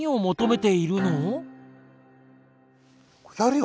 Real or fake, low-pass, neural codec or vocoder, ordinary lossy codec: real; none; none; none